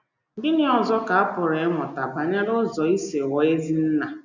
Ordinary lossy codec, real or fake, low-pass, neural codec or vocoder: none; real; 7.2 kHz; none